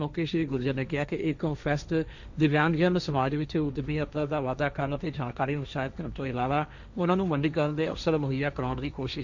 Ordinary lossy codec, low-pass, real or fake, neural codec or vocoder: none; 7.2 kHz; fake; codec, 16 kHz, 1.1 kbps, Voila-Tokenizer